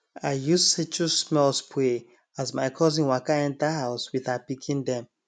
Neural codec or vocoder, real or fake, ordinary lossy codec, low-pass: none; real; none; 9.9 kHz